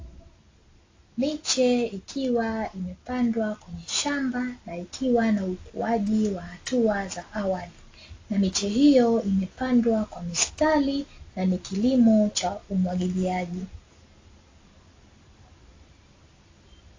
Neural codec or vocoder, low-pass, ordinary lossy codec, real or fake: none; 7.2 kHz; AAC, 32 kbps; real